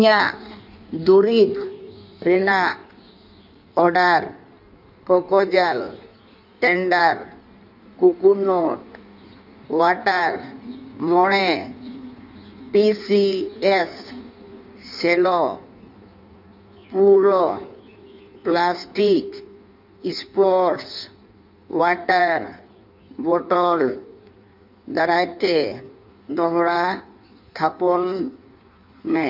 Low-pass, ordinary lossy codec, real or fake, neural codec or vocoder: 5.4 kHz; none; fake; codec, 16 kHz in and 24 kHz out, 1.1 kbps, FireRedTTS-2 codec